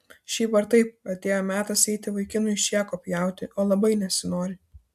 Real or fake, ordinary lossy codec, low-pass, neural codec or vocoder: real; AAC, 96 kbps; 14.4 kHz; none